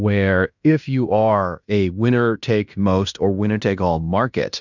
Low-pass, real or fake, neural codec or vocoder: 7.2 kHz; fake; codec, 16 kHz in and 24 kHz out, 0.9 kbps, LongCat-Audio-Codec, fine tuned four codebook decoder